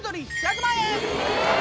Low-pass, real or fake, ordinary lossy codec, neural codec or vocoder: none; real; none; none